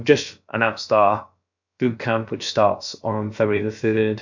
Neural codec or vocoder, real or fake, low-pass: codec, 16 kHz, about 1 kbps, DyCAST, with the encoder's durations; fake; 7.2 kHz